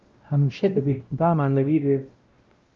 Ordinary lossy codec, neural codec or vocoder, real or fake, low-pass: Opus, 24 kbps; codec, 16 kHz, 0.5 kbps, X-Codec, WavLM features, trained on Multilingual LibriSpeech; fake; 7.2 kHz